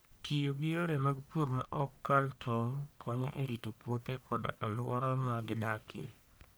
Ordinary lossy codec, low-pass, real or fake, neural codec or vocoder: none; none; fake; codec, 44.1 kHz, 1.7 kbps, Pupu-Codec